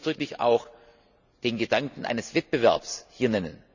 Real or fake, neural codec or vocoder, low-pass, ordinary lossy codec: real; none; 7.2 kHz; none